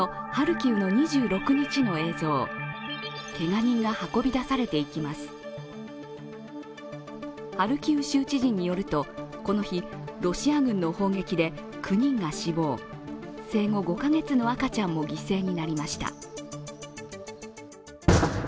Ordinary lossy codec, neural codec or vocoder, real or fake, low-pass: none; none; real; none